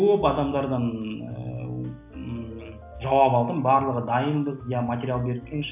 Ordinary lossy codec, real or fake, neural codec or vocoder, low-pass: none; real; none; 3.6 kHz